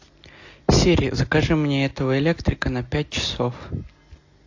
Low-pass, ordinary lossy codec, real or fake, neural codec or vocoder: 7.2 kHz; AAC, 48 kbps; real; none